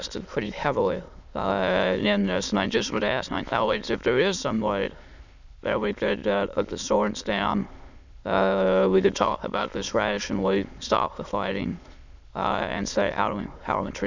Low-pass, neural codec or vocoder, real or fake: 7.2 kHz; autoencoder, 22.05 kHz, a latent of 192 numbers a frame, VITS, trained on many speakers; fake